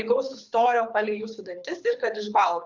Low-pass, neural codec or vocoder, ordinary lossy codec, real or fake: 7.2 kHz; codec, 24 kHz, 6 kbps, HILCodec; Opus, 64 kbps; fake